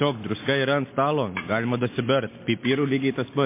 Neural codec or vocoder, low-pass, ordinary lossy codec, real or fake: none; 3.6 kHz; MP3, 24 kbps; real